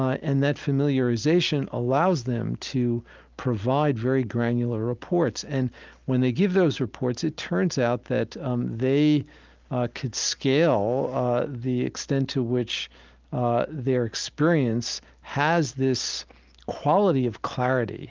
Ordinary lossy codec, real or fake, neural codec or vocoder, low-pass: Opus, 24 kbps; real; none; 7.2 kHz